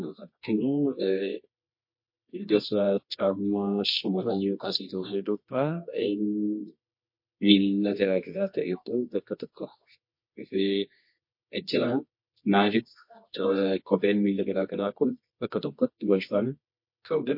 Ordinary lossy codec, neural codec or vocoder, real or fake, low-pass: MP3, 32 kbps; codec, 24 kHz, 0.9 kbps, WavTokenizer, medium music audio release; fake; 5.4 kHz